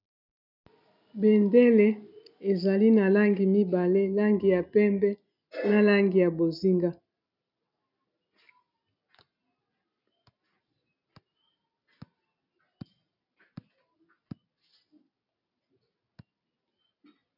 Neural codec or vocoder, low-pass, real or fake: none; 5.4 kHz; real